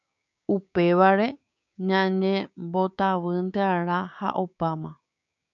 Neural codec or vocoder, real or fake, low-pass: codec, 16 kHz, 6 kbps, DAC; fake; 7.2 kHz